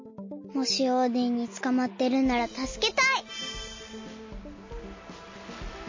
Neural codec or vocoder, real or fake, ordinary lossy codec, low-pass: none; real; MP3, 32 kbps; 7.2 kHz